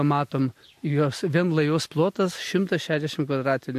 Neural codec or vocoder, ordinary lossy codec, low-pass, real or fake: none; MP3, 64 kbps; 14.4 kHz; real